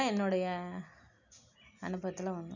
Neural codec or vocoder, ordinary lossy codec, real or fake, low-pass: none; none; real; 7.2 kHz